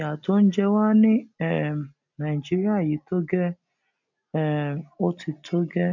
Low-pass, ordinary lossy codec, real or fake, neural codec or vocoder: 7.2 kHz; none; real; none